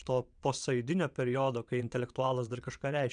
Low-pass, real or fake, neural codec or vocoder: 9.9 kHz; fake; vocoder, 22.05 kHz, 80 mel bands, WaveNeXt